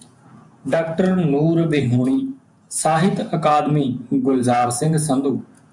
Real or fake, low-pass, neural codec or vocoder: fake; 10.8 kHz; vocoder, 48 kHz, 128 mel bands, Vocos